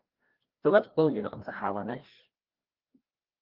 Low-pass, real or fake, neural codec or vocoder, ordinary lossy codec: 5.4 kHz; fake; codec, 16 kHz, 1 kbps, FreqCodec, larger model; Opus, 16 kbps